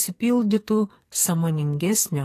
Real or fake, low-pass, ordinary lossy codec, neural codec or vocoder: fake; 14.4 kHz; AAC, 48 kbps; codec, 44.1 kHz, 2.6 kbps, SNAC